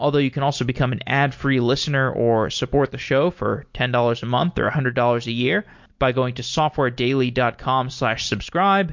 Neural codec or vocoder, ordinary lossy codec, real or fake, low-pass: none; MP3, 48 kbps; real; 7.2 kHz